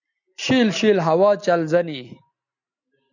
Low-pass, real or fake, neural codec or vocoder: 7.2 kHz; real; none